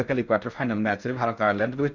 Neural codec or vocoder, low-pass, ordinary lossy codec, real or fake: codec, 16 kHz in and 24 kHz out, 0.6 kbps, FocalCodec, streaming, 4096 codes; 7.2 kHz; none; fake